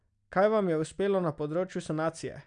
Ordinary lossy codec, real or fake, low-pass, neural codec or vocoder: none; real; 9.9 kHz; none